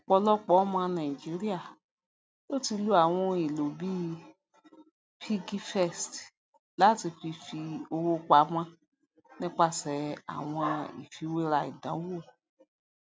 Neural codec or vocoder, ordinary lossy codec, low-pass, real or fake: none; none; none; real